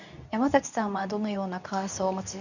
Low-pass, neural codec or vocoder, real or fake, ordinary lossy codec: 7.2 kHz; codec, 24 kHz, 0.9 kbps, WavTokenizer, medium speech release version 2; fake; none